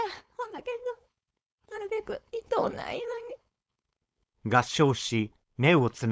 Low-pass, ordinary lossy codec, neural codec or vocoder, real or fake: none; none; codec, 16 kHz, 4.8 kbps, FACodec; fake